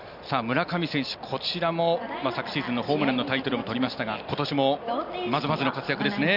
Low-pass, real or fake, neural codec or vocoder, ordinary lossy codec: 5.4 kHz; real; none; none